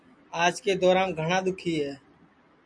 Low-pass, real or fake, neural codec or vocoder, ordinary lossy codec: 9.9 kHz; real; none; MP3, 64 kbps